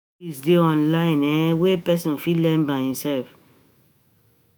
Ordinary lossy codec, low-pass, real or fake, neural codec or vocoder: none; none; fake; autoencoder, 48 kHz, 128 numbers a frame, DAC-VAE, trained on Japanese speech